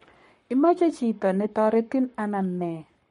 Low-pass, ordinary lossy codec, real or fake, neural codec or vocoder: 19.8 kHz; MP3, 48 kbps; fake; codec, 44.1 kHz, 7.8 kbps, Pupu-Codec